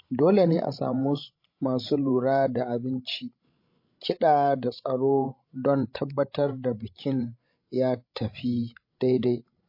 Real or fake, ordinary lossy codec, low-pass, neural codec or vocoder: fake; MP3, 32 kbps; 5.4 kHz; codec, 16 kHz, 16 kbps, FreqCodec, larger model